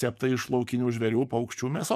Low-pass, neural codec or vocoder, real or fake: 14.4 kHz; codec, 44.1 kHz, 7.8 kbps, DAC; fake